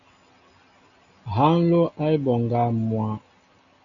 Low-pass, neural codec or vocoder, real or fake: 7.2 kHz; none; real